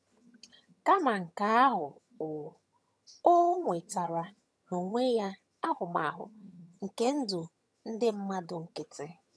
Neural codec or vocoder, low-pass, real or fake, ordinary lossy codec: vocoder, 22.05 kHz, 80 mel bands, HiFi-GAN; none; fake; none